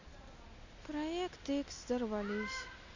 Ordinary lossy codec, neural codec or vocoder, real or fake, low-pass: Opus, 64 kbps; none; real; 7.2 kHz